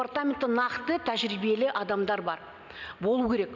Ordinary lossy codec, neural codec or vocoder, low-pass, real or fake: none; none; 7.2 kHz; real